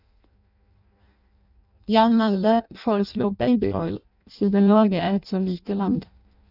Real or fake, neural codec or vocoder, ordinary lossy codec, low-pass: fake; codec, 16 kHz in and 24 kHz out, 0.6 kbps, FireRedTTS-2 codec; none; 5.4 kHz